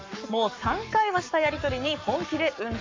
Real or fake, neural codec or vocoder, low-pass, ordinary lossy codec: fake; codec, 44.1 kHz, 7.8 kbps, Pupu-Codec; 7.2 kHz; MP3, 48 kbps